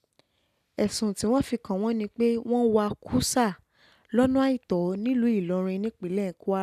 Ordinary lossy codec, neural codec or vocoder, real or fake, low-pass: none; none; real; 14.4 kHz